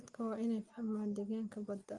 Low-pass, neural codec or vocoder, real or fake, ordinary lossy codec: 10.8 kHz; none; real; Opus, 24 kbps